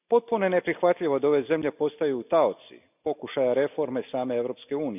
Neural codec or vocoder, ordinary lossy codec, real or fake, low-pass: none; none; real; 3.6 kHz